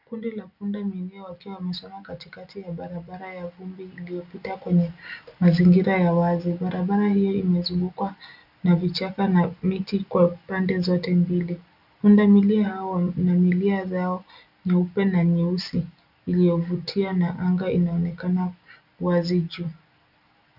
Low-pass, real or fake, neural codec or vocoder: 5.4 kHz; real; none